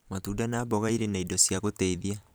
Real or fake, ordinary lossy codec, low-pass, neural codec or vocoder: fake; none; none; vocoder, 44.1 kHz, 128 mel bands every 512 samples, BigVGAN v2